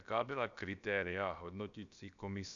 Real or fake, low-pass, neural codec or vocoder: fake; 7.2 kHz; codec, 16 kHz, about 1 kbps, DyCAST, with the encoder's durations